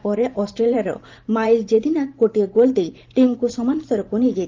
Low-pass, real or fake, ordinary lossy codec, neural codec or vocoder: 7.2 kHz; fake; Opus, 32 kbps; vocoder, 44.1 kHz, 128 mel bands every 512 samples, BigVGAN v2